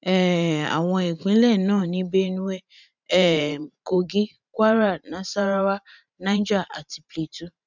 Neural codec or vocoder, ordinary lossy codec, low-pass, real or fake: vocoder, 44.1 kHz, 128 mel bands every 512 samples, BigVGAN v2; none; 7.2 kHz; fake